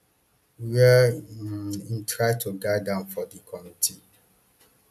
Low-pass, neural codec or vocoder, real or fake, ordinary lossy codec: 14.4 kHz; none; real; none